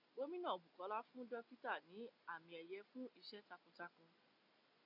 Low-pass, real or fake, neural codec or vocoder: 5.4 kHz; real; none